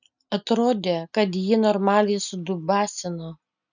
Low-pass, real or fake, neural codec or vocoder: 7.2 kHz; real; none